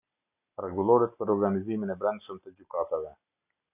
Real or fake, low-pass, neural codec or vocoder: real; 3.6 kHz; none